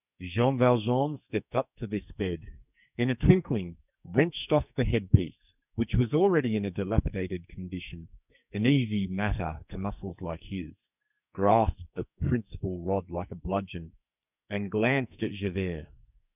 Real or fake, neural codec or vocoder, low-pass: fake; codec, 44.1 kHz, 2.6 kbps, SNAC; 3.6 kHz